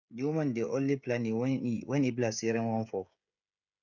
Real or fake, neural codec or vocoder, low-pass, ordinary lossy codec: fake; codec, 16 kHz, 16 kbps, FreqCodec, smaller model; 7.2 kHz; none